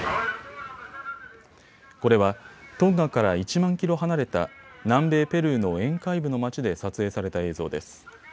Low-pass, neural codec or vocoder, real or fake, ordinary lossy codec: none; none; real; none